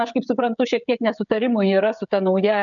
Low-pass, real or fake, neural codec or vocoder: 7.2 kHz; fake; codec, 16 kHz, 16 kbps, FreqCodec, smaller model